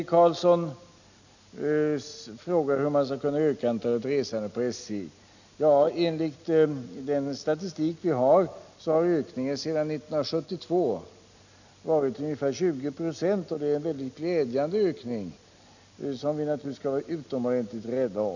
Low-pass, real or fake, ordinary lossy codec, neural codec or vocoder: 7.2 kHz; real; none; none